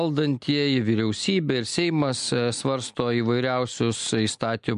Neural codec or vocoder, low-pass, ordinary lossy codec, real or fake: none; 10.8 kHz; MP3, 64 kbps; real